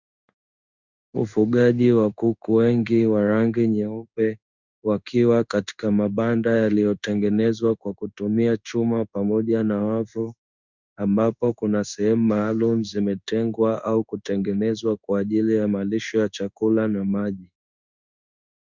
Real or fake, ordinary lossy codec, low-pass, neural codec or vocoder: fake; Opus, 64 kbps; 7.2 kHz; codec, 16 kHz in and 24 kHz out, 1 kbps, XY-Tokenizer